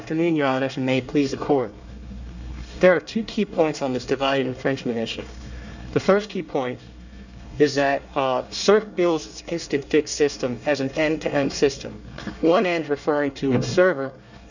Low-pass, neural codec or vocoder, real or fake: 7.2 kHz; codec, 24 kHz, 1 kbps, SNAC; fake